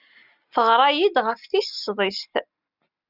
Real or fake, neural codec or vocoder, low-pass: real; none; 5.4 kHz